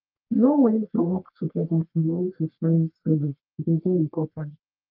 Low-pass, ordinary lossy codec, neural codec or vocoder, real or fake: 5.4 kHz; Opus, 32 kbps; codec, 44.1 kHz, 1.7 kbps, Pupu-Codec; fake